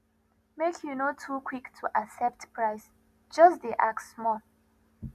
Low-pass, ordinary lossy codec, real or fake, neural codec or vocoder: 14.4 kHz; none; real; none